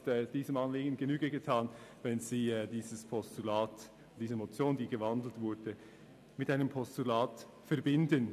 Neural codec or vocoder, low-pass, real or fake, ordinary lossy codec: none; 14.4 kHz; real; none